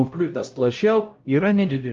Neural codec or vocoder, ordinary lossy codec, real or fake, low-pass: codec, 16 kHz, 0.5 kbps, X-Codec, HuBERT features, trained on LibriSpeech; Opus, 32 kbps; fake; 7.2 kHz